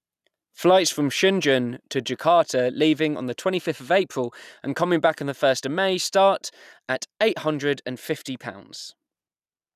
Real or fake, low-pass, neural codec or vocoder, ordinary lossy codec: real; 14.4 kHz; none; none